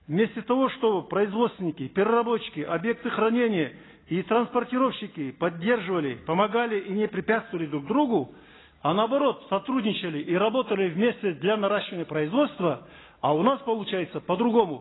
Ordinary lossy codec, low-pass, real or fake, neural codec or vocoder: AAC, 16 kbps; 7.2 kHz; real; none